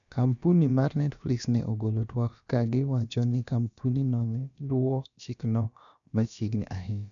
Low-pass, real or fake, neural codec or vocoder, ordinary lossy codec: 7.2 kHz; fake; codec, 16 kHz, about 1 kbps, DyCAST, with the encoder's durations; none